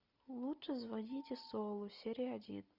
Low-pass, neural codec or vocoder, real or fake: 5.4 kHz; none; real